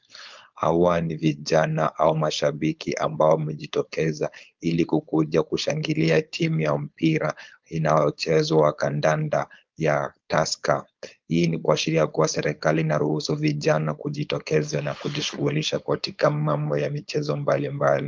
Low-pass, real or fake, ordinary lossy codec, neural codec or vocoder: 7.2 kHz; fake; Opus, 32 kbps; codec, 16 kHz, 4.8 kbps, FACodec